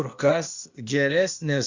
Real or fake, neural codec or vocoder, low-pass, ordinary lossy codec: fake; codec, 16 kHz, 0.8 kbps, ZipCodec; 7.2 kHz; Opus, 64 kbps